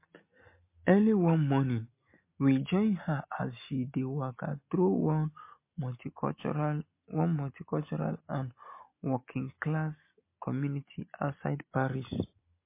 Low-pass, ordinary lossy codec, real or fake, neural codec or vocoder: 3.6 kHz; MP3, 24 kbps; real; none